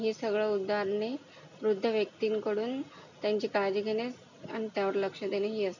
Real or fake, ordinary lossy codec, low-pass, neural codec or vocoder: real; none; 7.2 kHz; none